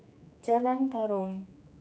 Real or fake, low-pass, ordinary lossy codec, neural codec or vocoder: fake; none; none; codec, 16 kHz, 2 kbps, X-Codec, HuBERT features, trained on general audio